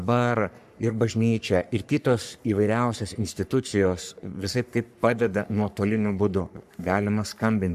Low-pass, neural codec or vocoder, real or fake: 14.4 kHz; codec, 44.1 kHz, 3.4 kbps, Pupu-Codec; fake